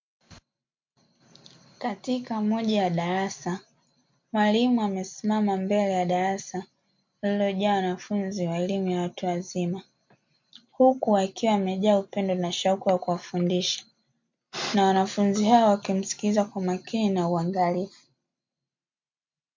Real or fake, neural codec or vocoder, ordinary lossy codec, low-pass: real; none; MP3, 48 kbps; 7.2 kHz